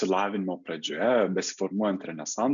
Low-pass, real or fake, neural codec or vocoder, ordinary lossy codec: 7.2 kHz; real; none; MP3, 96 kbps